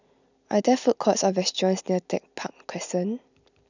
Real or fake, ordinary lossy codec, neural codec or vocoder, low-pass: real; none; none; 7.2 kHz